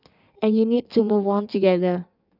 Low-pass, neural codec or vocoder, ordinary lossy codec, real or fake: 5.4 kHz; codec, 16 kHz in and 24 kHz out, 1.1 kbps, FireRedTTS-2 codec; none; fake